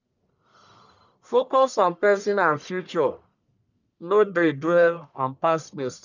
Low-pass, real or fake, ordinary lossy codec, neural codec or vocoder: 7.2 kHz; fake; none; codec, 44.1 kHz, 1.7 kbps, Pupu-Codec